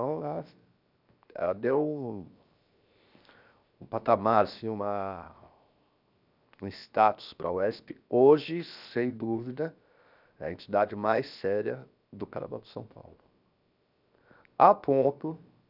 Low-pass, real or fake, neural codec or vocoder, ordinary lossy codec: 5.4 kHz; fake; codec, 16 kHz, 0.7 kbps, FocalCodec; none